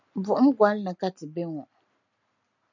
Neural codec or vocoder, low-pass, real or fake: none; 7.2 kHz; real